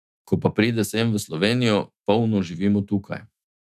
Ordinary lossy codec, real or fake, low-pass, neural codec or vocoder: none; fake; 14.4 kHz; autoencoder, 48 kHz, 128 numbers a frame, DAC-VAE, trained on Japanese speech